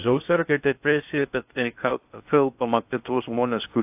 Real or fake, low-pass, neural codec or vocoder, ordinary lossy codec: fake; 3.6 kHz; codec, 16 kHz in and 24 kHz out, 0.6 kbps, FocalCodec, streaming, 2048 codes; AAC, 32 kbps